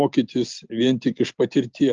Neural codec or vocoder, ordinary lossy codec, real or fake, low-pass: none; Opus, 24 kbps; real; 7.2 kHz